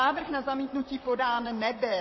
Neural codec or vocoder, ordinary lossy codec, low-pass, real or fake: vocoder, 44.1 kHz, 128 mel bands, Pupu-Vocoder; MP3, 24 kbps; 7.2 kHz; fake